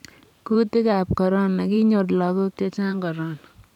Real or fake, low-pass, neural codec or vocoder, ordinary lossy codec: fake; 19.8 kHz; vocoder, 44.1 kHz, 128 mel bands every 512 samples, BigVGAN v2; none